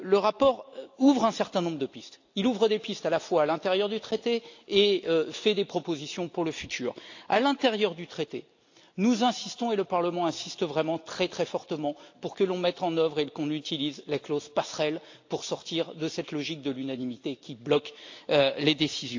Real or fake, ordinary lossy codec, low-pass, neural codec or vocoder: real; AAC, 48 kbps; 7.2 kHz; none